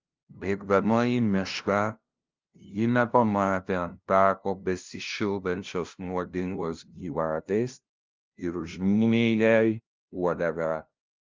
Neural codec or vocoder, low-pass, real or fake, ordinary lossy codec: codec, 16 kHz, 0.5 kbps, FunCodec, trained on LibriTTS, 25 frames a second; 7.2 kHz; fake; Opus, 24 kbps